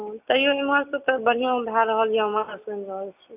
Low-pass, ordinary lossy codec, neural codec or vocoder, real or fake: 3.6 kHz; none; none; real